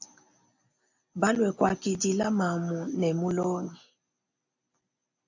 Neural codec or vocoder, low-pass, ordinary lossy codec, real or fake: none; 7.2 kHz; AAC, 48 kbps; real